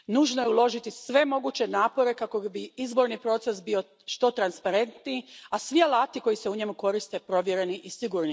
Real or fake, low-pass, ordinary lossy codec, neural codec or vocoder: real; none; none; none